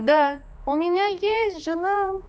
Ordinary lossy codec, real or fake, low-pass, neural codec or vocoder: none; fake; none; codec, 16 kHz, 2 kbps, X-Codec, HuBERT features, trained on general audio